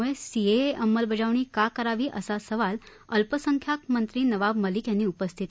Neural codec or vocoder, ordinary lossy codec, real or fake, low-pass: none; none; real; none